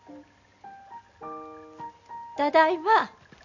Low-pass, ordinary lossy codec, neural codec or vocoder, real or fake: 7.2 kHz; none; none; real